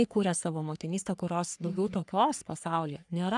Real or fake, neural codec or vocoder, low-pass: fake; codec, 44.1 kHz, 3.4 kbps, Pupu-Codec; 10.8 kHz